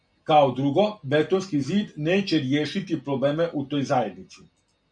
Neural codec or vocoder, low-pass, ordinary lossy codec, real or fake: none; 9.9 kHz; MP3, 96 kbps; real